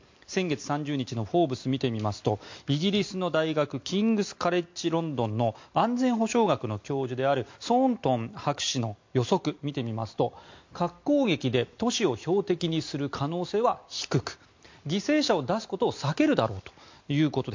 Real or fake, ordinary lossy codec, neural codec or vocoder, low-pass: real; MP3, 48 kbps; none; 7.2 kHz